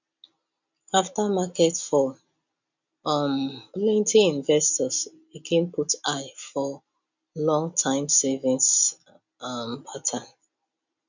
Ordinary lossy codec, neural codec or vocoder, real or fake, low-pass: none; none; real; 7.2 kHz